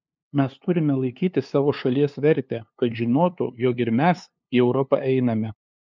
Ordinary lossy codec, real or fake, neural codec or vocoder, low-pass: MP3, 64 kbps; fake; codec, 16 kHz, 2 kbps, FunCodec, trained on LibriTTS, 25 frames a second; 7.2 kHz